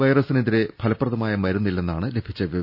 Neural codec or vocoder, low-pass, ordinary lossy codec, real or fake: none; 5.4 kHz; none; real